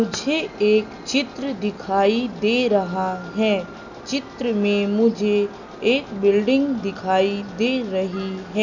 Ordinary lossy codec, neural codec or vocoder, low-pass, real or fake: none; none; 7.2 kHz; real